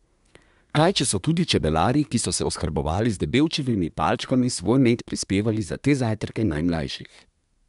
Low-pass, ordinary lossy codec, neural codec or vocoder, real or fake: 10.8 kHz; none; codec, 24 kHz, 1 kbps, SNAC; fake